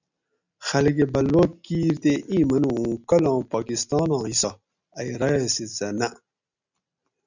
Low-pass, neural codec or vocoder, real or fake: 7.2 kHz; none; real